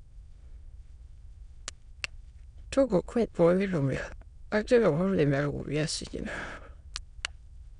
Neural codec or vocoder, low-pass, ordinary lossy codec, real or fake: autoencoder, 22.05 kHz, a latent of 192 numbers a frame, VITS, trained on many speakers; 9.9 kHz; none; fake